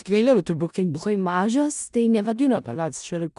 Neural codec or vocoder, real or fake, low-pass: codec, 16 kHz in and 24 kHz out, 0.4 kbps, LongCat-Audio-Codec, four codebook decoder; fake; 10.8 kHz